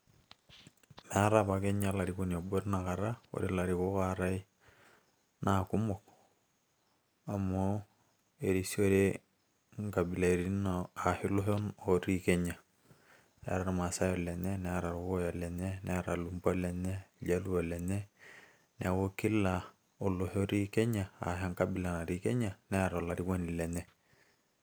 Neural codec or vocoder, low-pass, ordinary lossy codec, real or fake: none; none; none; real